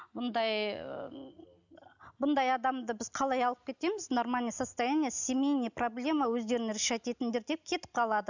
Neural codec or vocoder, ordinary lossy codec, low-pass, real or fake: none; none; 7.2 kHz; real